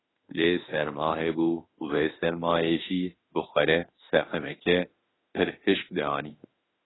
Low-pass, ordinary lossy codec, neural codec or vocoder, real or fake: 7.2 kHz; AAC, 16 kbps; autoencoder, 48 kHz, 32 numbers a frame, DAC-VAE, trained on Japanese speech; fake